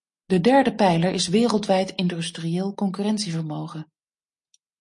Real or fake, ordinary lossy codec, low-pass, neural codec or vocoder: real; MP3, 48 kbps; 10.8 kHz; none